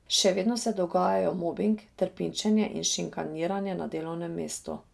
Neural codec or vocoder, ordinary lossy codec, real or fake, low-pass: none; none; real; none